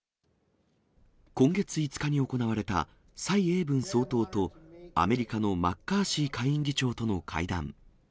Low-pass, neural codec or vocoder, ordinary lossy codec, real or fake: none; none; none; real